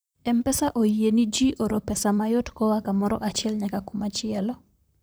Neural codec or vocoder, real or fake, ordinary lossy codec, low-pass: vocoder, 44.1 kHz, 128 mel bands, Pupu-Vocoder; fake; none; none